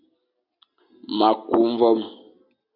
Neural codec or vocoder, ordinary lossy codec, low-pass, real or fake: none; AAC, 48 kbps; 5.4 kHz; real